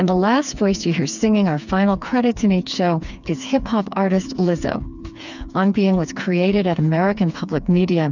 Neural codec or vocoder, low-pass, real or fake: codec, 16 kHz, 4 kbps, FreqCodec, smaller model; 7.2 kHz; fake